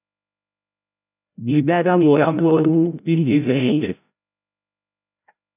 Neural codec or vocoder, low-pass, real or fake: codec, 16 kHz, 0.5 kbps, FreqCodec, larger model; 3.6 kHz; fake